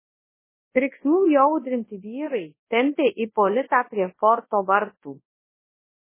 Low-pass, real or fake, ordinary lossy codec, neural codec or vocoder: 3.6 kHz; fake; MP3, 16 kbps; codec, 24 kHz, 0.9 kbps, WavTokenizer, large speech release